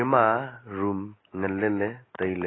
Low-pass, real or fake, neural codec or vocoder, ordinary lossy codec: 7.2 kHz; real; none; AAC, 16 kbps